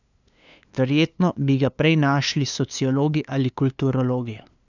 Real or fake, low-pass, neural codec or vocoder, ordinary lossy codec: fake; 7.2 kHz; codec, 16 kHz, 2 kbps, FunCodec, trained on LibriTTS, 25 frames a second; none